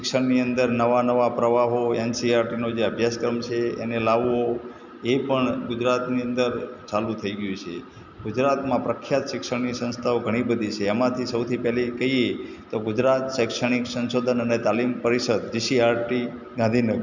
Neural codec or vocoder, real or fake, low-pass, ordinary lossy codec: none; real; 7.2 kHz; none